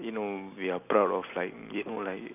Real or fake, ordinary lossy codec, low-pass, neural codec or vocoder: real; none; 3.6 kHz; none